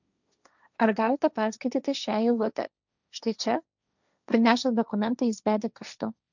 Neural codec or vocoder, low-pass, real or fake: codec, 16 kHz, 1.1 kbps, Voila-Tokenizer; 7.2 kHz; fake